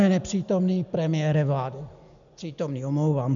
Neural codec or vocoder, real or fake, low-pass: none; real; 7.2 kHz